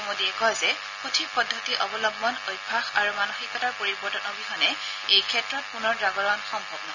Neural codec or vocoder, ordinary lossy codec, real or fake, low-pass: none; none; real; 7.2 kHz